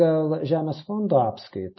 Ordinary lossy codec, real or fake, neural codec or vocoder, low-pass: MP3, 24 kbps; real; none; 7.2 kHz